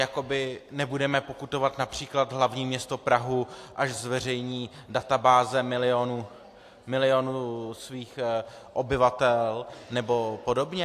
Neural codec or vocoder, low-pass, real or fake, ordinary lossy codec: none; 14.4 kHz; real; AAC, 64 kbps